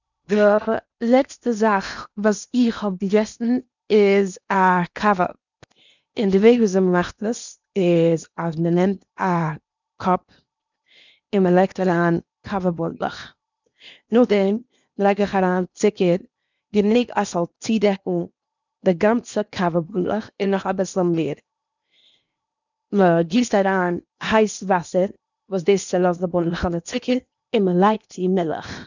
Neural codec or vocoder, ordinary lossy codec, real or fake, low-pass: codec, 16 kHz in and 24 kHz out, 0.8 kbps, FocalCodec, streaming, 65536 codes; none; fake; 7.2 kHz